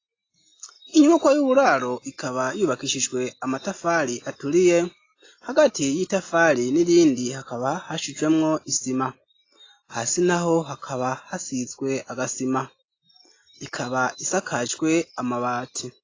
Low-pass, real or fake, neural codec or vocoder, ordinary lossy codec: 7.2 kHz; real; none; AAC, 32 kbps